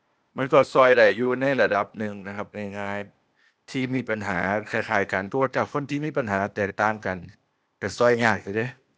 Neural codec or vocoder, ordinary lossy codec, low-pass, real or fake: codec, 16 kHz, 0.8 kbps, ZipCodec; none; none; fake